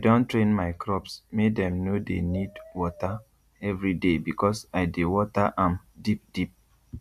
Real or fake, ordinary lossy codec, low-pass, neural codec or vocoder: real; none; 14.4 kHz; none